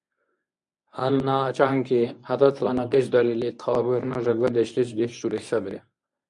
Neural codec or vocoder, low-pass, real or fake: codec, 24 kHz, 0.9 kbps, WavTokenizer, medium speech release version 1; 10.8 kHz; fake